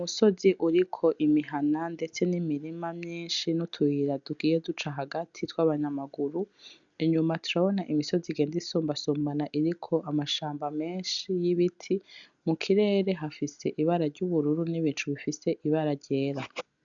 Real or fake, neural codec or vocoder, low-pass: real; none; 7.2 kHz